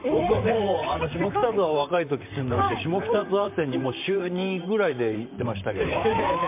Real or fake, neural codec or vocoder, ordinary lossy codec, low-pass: fake; vocoder, 44.1 kHz, 128 mel bands, Pupu-Vocoder; MP3, 32 kbps; 3.6 kHz